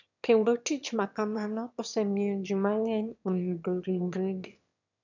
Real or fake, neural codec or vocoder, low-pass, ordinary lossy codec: fake; autoencoder, 22.05 kHz, a latent of 192 numbers a frame, VITS, trained on one speaker; 7.2 kHz; none